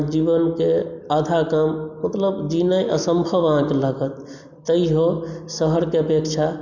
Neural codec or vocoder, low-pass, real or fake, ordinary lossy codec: none; 7.2 kHz; real; none